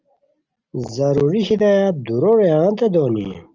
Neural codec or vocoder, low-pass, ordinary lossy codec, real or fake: none; 7.2 kHz; Opus, 24 kbps; real